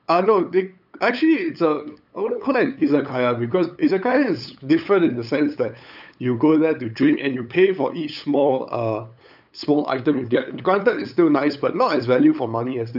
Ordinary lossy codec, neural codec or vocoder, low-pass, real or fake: none; codec, 16 kHz, 8 kbps, FunCodec, trained on LibriTTS, 25 frames a second; 5.4 kHz; fake